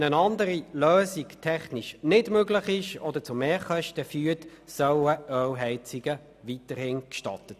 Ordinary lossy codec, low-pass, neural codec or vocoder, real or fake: none; 14.4 kHz; none; real